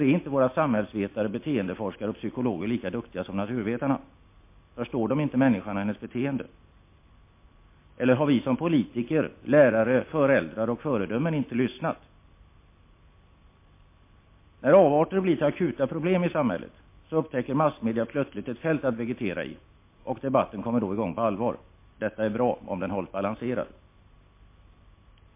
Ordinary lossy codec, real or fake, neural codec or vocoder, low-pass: MP3, 24 kbps; real; none; 3.6 kHz